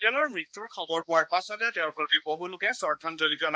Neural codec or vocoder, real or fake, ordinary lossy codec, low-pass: codec, 16 kHz, 1 kbps, X-Codec, HuBERT features, trained on balanced general audio; fake; none; none